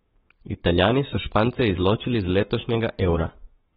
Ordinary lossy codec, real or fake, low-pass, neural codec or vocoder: AAC, 16 kbps; fake; 19.8 kHz; codec, 44.1 kHz, 7.8 kbps, Pupu-Codec